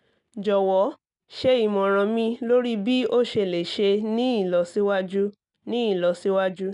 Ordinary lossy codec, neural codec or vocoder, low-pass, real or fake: none; none; 10.8 kHz; real